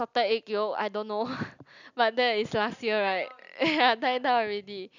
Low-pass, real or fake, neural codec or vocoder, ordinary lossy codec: 7.2 kHz; real; none; none